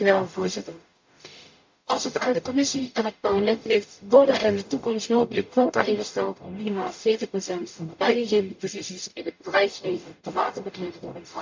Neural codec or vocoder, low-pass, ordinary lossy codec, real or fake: codec, 44.1 kHz, 0.9 kbps, DAC; 7.2 kHz; MP3, 64 kbps; fake